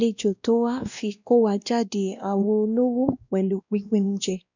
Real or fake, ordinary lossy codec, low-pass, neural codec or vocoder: fake; none; 7.2 kHz; codec, 16 kHz, 1 kbps, X-Codec, WavLM features, trained on Multilingual LibriSpeech